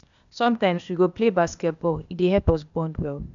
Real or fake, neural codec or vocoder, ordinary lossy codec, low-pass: fake; codec, 16 kHz, 0.8 kbps, ZipCodec; none; 7.2 kHz